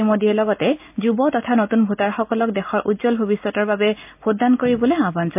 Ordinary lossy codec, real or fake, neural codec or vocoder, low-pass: MP3, 32 kbps; real; none; 3.6 kHz